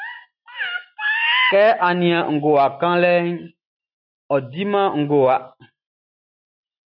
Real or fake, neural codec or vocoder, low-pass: real; none; 5.4 kHz